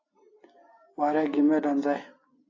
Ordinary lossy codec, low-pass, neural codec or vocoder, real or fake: AAC, 32 kbps; 7.2 kHz; none; real